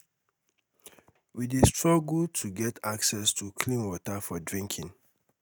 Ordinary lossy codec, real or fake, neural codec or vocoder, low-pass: none; real; none; none